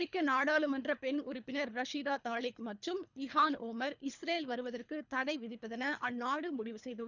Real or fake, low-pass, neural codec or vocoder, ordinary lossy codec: fake; 7.2 kHz; codec, 24 kHz, 3 kbps, HILCodec; none